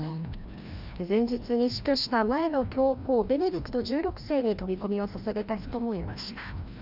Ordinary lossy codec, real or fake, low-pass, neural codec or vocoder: none; fake; 5.4 kHz; codec, 16 kHz, 1 kbps, FreqCodec, larger model